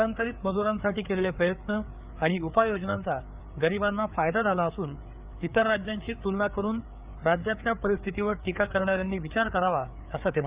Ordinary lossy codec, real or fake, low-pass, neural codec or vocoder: Opus, 64 kbps; fake; 3.6 kHz; codec, 16 kHz, 4 kbps, FreqCodec, larger model